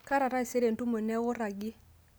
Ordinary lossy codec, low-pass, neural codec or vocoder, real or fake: none; none; none; real